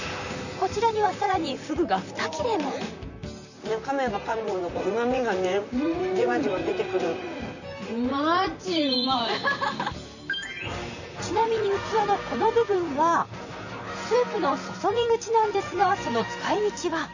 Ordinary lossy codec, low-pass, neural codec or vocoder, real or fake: none; 7.2 kHz; vocoder, 44.1 kHz, 128 mel bands, Pupu-Vocoder; fake